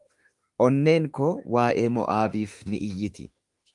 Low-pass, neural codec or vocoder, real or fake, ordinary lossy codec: 10.8 kHz; autoencoder, 48 kHz, 32 numbers a frame, DAC-VAE, trained on Japanese speech; fake; Opus, 32 kbps